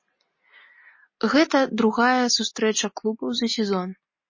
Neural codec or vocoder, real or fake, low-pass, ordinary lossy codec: none; real; 7.2 kHz; MP3, 32 kbps